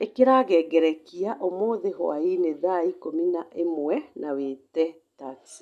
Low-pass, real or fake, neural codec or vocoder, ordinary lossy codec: 14.4 kHz; real; none; none